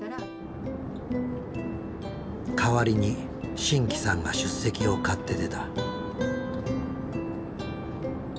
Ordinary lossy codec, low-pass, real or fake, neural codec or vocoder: none; none; real; none